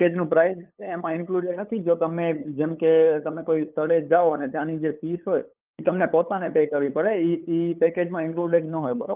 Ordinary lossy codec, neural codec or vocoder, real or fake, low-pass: Opus, 24 kbps; codec, 16 kHz, 8 kbps, FunCodec, trained on LibriTTS, 25 frames a second; fake; 3.6 kHz